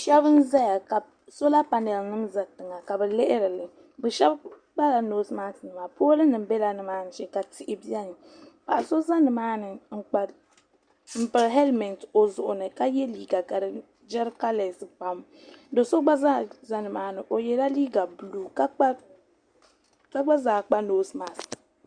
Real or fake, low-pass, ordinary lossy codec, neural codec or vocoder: real; 9.9 kHz; Opus, 64 kbps; none